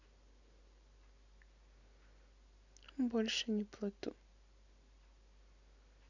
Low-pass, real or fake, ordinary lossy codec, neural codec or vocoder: 7.2 kHz; real; none; none